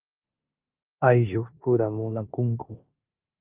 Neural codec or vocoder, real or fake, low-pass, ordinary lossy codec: codec, 16 kHz in and 24 kHz out, 0.9 kbps, LongCat-Audio-Codec, four codebook decoder; fake; 3.6 kHz; Opus, 24 kbps